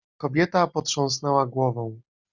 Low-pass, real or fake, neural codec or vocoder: 7.2 kHz; real; none